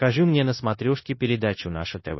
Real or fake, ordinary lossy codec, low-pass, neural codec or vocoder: fake; MP3, 24 kbps; 7.2 kHz; codec, 16 kHz, 0.9 kbps, LongCat-Audio-Codec